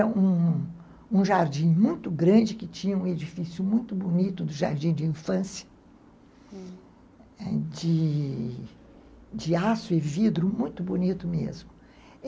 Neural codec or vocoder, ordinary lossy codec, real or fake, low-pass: none; none; real; none